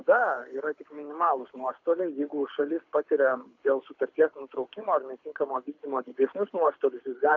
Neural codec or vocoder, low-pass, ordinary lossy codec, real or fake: codec, 24 kHz, 6 kbps, HILCodec; 7.2 kHz; AAC, 48 kbps; fake